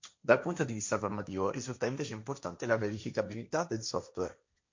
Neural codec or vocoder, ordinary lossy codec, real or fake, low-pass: codec, 16 kHz, 1.1 kbps, Voila-Tokenizer; MP3, 48 kbps; fake; 7.2 kHz